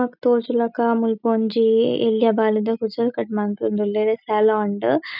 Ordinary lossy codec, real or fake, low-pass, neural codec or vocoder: none; real; 5.4 kHz; none